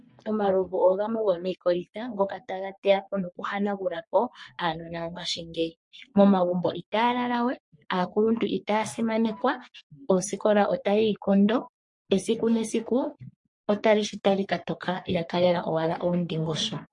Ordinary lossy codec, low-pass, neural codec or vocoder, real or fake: MP3, 48 kbps; 10.8 kHz; codec, 44.1 kHz, 3.4 kbps, Pupu-Codec; fake